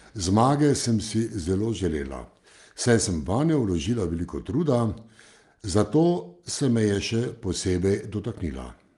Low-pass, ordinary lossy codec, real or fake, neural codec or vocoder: 10.8 kHz; Opus, 32 kbps; real; none